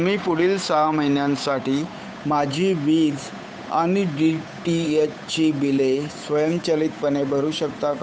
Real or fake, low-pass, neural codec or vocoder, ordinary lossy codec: fake; none; codec, 16 kHz, 8 kbps, FunCodec, trained on Chinese and English, 25 frames a second; none